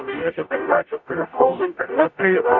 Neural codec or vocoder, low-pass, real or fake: codec, 44.1 kHz, 0.9 kbps, DAC; 7.2 kHz; fake